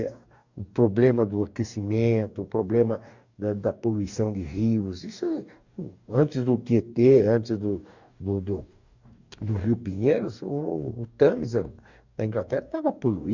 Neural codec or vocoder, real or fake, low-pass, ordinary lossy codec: codec, 44.1 kHz, 2.6 kbps, DAC; fake; 7.2 kHz; none